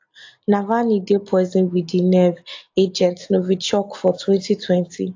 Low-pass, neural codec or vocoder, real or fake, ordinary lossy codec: 7.2 kHz; none; real; AAC, 48 kbps